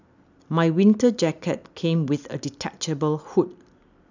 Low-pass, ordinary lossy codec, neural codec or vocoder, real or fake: 7.2 kHz; none; none; real